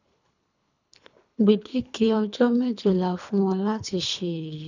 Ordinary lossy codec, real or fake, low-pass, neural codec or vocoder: none; fake; 7.2 kHz; codec, 24 kHz, 3 kbps, HILCodec